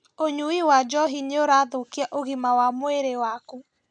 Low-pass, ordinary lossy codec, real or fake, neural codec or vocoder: none; none; real; none